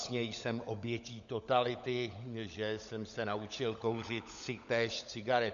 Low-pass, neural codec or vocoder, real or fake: 7.2 kHz; codec, 16 kHz, 16 kbps, FunCodec, trained on LibriTTS, 50 frames a second; fake